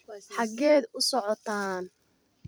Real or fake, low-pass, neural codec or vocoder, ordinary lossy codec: fake; none; vocoder, 44.1 kHz, 128 mel bands every 256 samples, BigVGAN v2; none